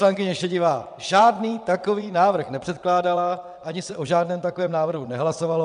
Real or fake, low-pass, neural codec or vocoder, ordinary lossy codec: fake; 9.9 kHz; vocoder, 22.05 kHz, 80 mel bands, WaveNeXt; MP3, 96 kbps